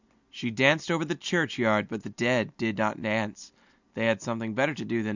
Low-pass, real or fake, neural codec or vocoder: 7.2 kHz; real; none